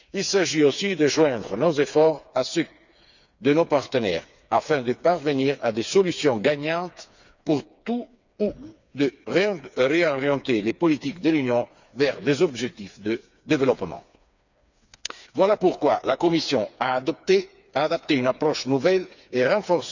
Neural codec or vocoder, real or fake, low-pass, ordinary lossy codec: codec, 16 kHz, 4 kbps, FreqCodec, smaller model; fake; 7.2 kHz; none